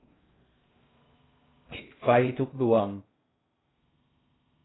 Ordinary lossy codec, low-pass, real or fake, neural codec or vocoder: AAC, 16 kbps; 7.2 kHz; fake; codec, 16 kHz in and 24 kHz out, 0.6 kbps, FocalCodec, streaming, 2048 codes